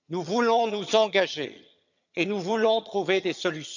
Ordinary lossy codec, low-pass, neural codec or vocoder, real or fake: none; 7.2 kHz; vocoder, 22.05 kHz, 80 mel bands, HiFi-GAN; fake